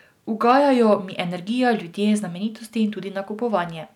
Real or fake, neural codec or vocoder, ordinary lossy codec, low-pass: real; none; none; 19.8 kHz